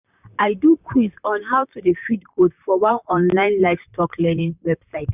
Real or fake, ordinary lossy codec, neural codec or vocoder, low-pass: fake; none; vocoder, 44.1 kHz, 128 mel bands, Pupu-Vocoder; 3.6 kHz